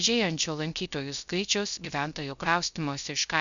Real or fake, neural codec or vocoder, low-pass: fake; codec, 16 kHz, 0.3 kbps, FocalCodec; 7.2 kHz